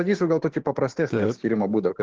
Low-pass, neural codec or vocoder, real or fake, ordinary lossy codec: 7.2 kHz; codec, 16 kHz, 6 kbps, DAC; fake; Opus, 16 kbps